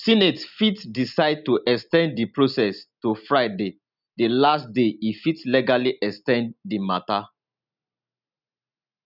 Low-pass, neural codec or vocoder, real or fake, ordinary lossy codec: 5.4 kHz; none; real; none